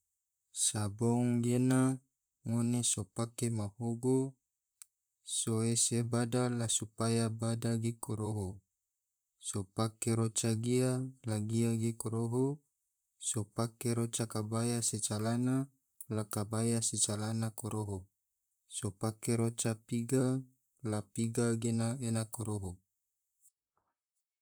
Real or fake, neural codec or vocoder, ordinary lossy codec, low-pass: fake; vocoder, 44.1 kHz, 128 mel bands, Pupu-Vocoder; none; none